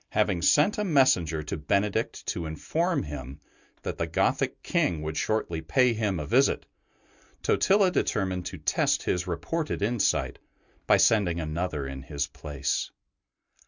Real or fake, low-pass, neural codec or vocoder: real; 7.2 kHz; none